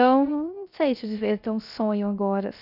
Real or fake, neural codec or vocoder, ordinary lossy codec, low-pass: fake; codec, 16 kHz, 0.3 kbps, FocalCodec; none; 5.4 kHz